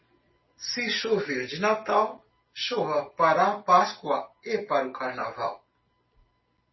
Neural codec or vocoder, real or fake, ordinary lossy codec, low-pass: none; real; MP3, 24 kbps; 7.2 kHz